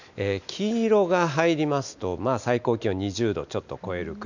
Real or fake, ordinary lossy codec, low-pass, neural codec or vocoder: real; none; 7.2 kHz; none